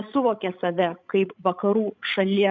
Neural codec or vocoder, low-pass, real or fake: codec, 16 kHz, 16 kbps, FreqCodec, larger model; 7.2 kHz; fake